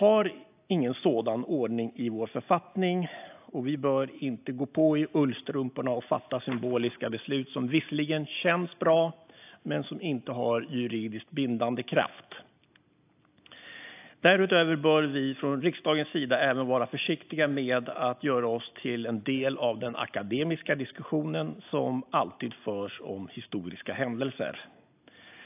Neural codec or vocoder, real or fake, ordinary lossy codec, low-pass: none; real; AAC, 32 kbps; 3.6 kHz